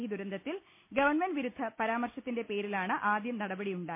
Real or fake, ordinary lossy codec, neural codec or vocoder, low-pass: real; MP3, 24 kbps; none; 3.6 kHz